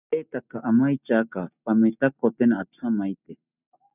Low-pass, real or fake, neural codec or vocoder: 3.6 kHz; real; none